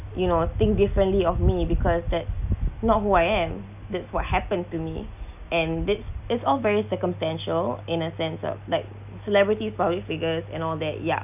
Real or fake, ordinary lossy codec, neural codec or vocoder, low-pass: real; none; none; 3.6 kHz